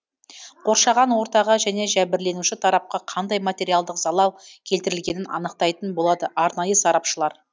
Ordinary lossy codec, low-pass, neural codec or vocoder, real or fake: none; none; none; real